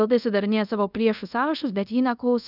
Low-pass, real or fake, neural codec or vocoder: 5.4 kHz; fake; codec, 24 kHz, 0.5 kbps, DualCodec